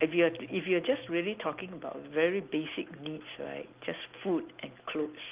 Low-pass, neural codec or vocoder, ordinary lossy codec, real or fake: 3.6 kHz; none; Opus, 32 kbps; real